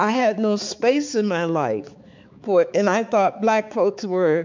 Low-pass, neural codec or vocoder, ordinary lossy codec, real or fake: 7.2 kHz; codec, 16 kHz, 4 kbps, X-Codec, HuBERT features, trained on balanced general audio; MP3, 64 kbps; fake